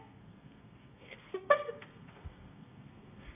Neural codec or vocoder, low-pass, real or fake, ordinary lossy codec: codec, 32 kHz, 1.9 kbps, SNAC; 3.6 kHz; fake; none